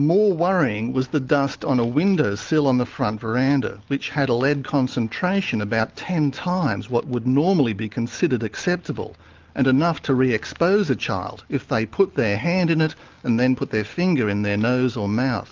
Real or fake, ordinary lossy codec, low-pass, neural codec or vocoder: real; Opus, 32 kbps; 7.2 kHz; none